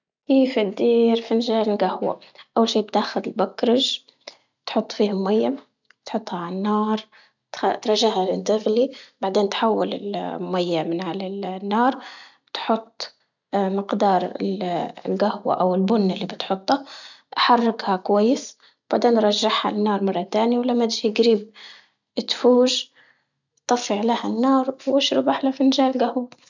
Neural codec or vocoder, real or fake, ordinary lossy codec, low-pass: none; real; none; 7.2 kHz